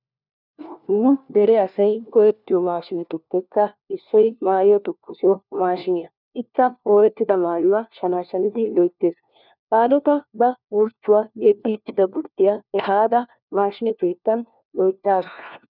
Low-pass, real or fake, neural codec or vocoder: 5.4 kHz; fake; codec, 16 kHz, 1 kbps, FunCodec, trained on LibriTTS, 50 frames a second